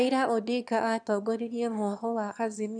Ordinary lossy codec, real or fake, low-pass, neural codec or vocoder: none; fake; 9.9 kHz; autoencoder, 22.05 kHz, a latent of 192 numbers a frame, VITS, trained on one speaker